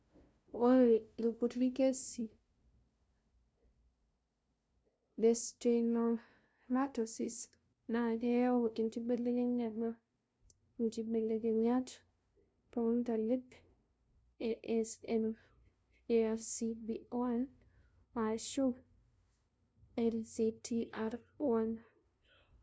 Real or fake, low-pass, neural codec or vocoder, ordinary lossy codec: fake; none; codec, 16 kHz, 0.5 kbps, FunCodec, trained on LibriTTS, 25 frames a second; none